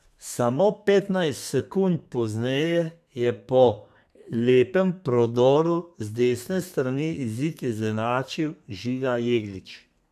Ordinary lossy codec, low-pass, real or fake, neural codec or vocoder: none; 14.4 kHz; fake; codec, 32 kHz, 1.9 kbps, SNAC